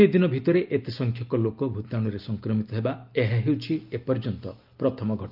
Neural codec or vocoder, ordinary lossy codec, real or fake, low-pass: none; Opus, 24 kbps; real; 5.4 kHz